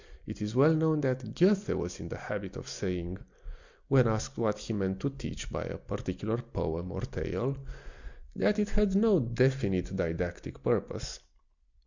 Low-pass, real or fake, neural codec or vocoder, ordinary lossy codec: 7.2 kHz; real; none; Opus, 64 kbps